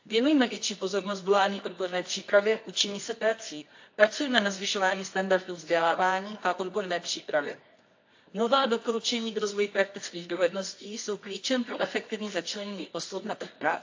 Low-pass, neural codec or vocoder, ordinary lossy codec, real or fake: 7.2 kHz; codec, 24 kHz, 0.9 kbps, WavTokenizer, medium music audio release; AAC, 48 kbps; fake